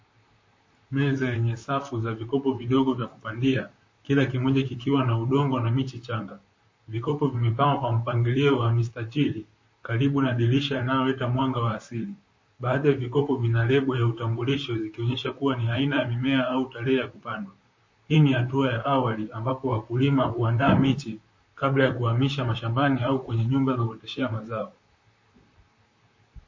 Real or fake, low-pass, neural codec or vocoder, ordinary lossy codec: fake; 7.2 kHz; vocoder, 44.1 kHz, 128 mel bands, Pupu-Vocoder; MP3, 32 kbps